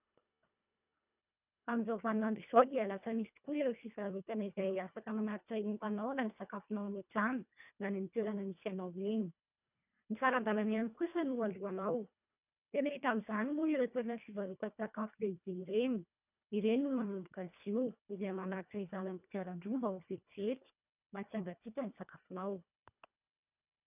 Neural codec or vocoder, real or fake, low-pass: codec, 24 kHz, 1.5 kbps, HILCodec; fake; 3.6 kHz